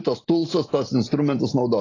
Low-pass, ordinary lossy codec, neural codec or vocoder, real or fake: 7.2 kHz; AAC, 32 kbps; none; real